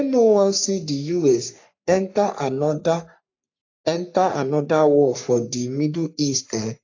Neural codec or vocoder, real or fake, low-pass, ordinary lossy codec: codec, 44.1 kHz, 3.4 kbps, Pupu-Codec; fake; 7.2 kHz; AAC, 48 kbps